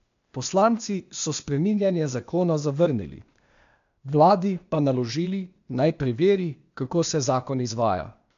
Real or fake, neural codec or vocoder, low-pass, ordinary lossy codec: fake; codec, 16 kHz, 0.8 kbps, ZipCodec; 7.2 kHz; MP3, 64 kbps